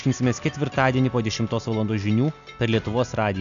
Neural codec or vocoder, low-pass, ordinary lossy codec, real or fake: none; 7.2 kHz; AAC, 96 kbps; real